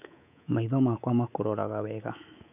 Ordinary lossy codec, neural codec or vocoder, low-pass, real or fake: none; codec, 24 kHz, 3.1 kbps, DualCodec; 3.6 kHz; fake